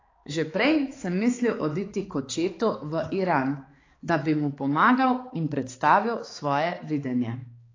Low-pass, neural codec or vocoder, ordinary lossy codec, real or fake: 7.2 kHz; codec, 16 kHz, 4 kbps, X-Codec, HuBERT features, trained on balanced general audio; AAC, 32 kbps; fake